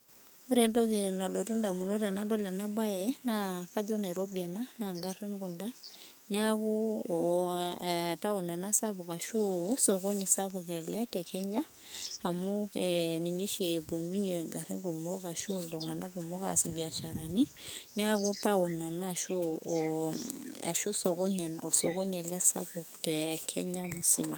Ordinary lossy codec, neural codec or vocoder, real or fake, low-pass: none; codec, 44.1 kHz, 2.6 kbps, SNAC; fake; none